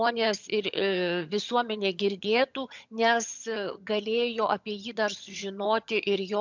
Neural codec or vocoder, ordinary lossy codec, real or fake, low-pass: vocoder, 22.05 kHz, 80 mel bands, HiFi-GAN; MP3, 64 kbps; fake; 7.2 kHz